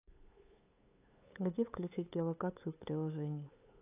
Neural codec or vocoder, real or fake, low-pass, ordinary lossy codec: codec, 16 kHz, 8 kbps, FunCodec, trained on LibriTTS, 25 frames a second; fake; 3.6 kHz; AAC, 24 kbps